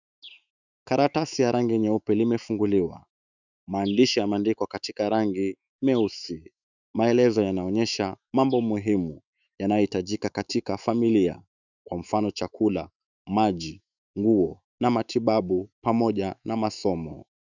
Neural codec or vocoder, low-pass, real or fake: autoencoder, 48 kHz, 128 numbers a frame, DAC-VAE, trained on Japanese speech; 7.2 kHz; fake